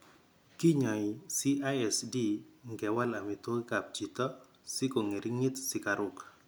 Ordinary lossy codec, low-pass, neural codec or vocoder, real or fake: none; none; none; real